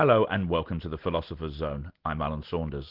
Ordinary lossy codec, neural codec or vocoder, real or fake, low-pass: Opus, 16 kbps; none; real; 5.4 kHz